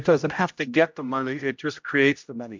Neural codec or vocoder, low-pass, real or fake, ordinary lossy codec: codec, 16 kHz, 0.5 kbps, X-Codec, HuBERT features, trained on general audio; 7.2 kHz; fake; MP3, 64 kbps